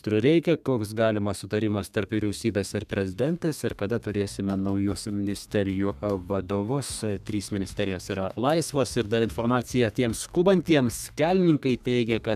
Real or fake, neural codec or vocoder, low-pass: fake; codec, 32 kHz, 1.9 kbps, SNAC; 14.4 kHz